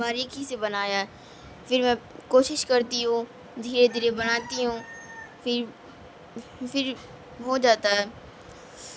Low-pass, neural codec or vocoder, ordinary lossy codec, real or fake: none; none; none; real